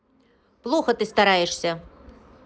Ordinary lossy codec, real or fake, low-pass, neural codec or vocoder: none; real; none; none